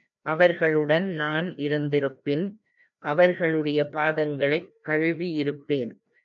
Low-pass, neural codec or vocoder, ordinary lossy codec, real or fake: 7.2 kHz; codec, 16 kHz, 1 kbps, FreqCodec, larger model; MP3, 64 kbps; fake